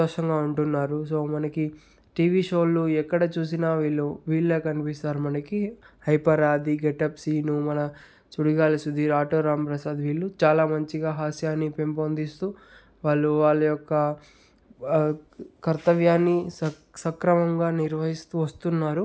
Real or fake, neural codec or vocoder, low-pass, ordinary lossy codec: real; none; none; none